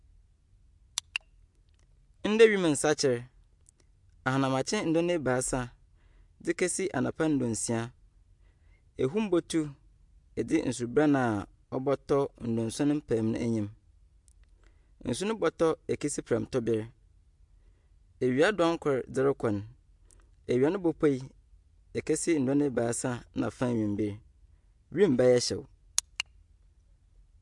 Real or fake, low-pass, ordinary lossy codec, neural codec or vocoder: real; 10.8 kHz; MP3, 64 kbps; none